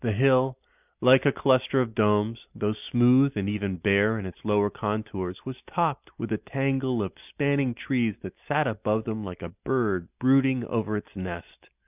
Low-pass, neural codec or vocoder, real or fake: 3.6 kHz; none; real